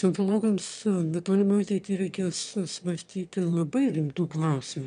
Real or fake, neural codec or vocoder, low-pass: fake; autoencoder, 22.05 kHz, a latent of 192 numbers a frame, VITS, trained on one speaker; 9.9 kHz